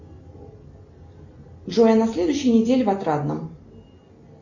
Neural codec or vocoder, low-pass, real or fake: none; 7.2 kHz; real